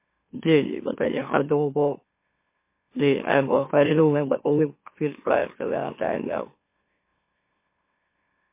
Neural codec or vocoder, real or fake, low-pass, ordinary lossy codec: autoencoder, 44.1 kHz, a latent of 192 numbers a frame, MeloTTS; fake; 3.6 kHz; MP3, 24 kbps